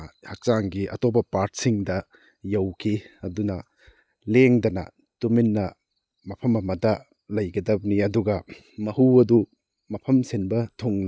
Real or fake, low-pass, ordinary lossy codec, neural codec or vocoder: real; none; none; none